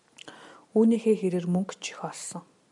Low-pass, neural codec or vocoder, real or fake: 10.8 kHz; none; real